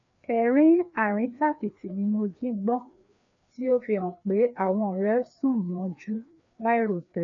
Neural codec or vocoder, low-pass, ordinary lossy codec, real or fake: codec, 16 kHz, 2 kbps, FreqCodec, larger model; 7.2 kHz; none; fake